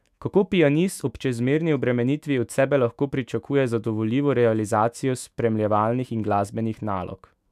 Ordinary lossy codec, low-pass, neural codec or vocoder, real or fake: none; 14.4 kHz; autoencoder, 48 kHz, 128 numbers a frame, DAC-VAE, trained on Japanese speech; fake